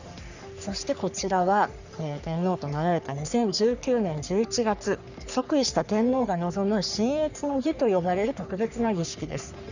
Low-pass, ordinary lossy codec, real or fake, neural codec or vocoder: 7.2 kHz; none; fake; codec, 44.1 kHz, 3.4 kbps, Pupu-Codec